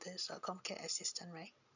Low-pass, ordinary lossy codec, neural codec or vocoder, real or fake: 7.2 kHz; none; none; real